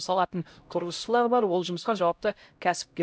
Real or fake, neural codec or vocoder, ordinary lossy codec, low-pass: fake; codec, 16 kHz, 0.5 kbps, X-Codec, HuBERT features, trained on LibriSpeech; none; none